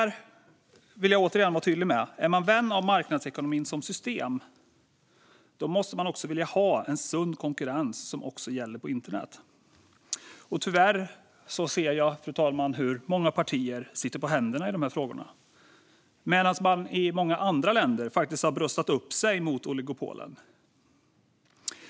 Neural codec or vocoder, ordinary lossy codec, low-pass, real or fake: none; none; none; real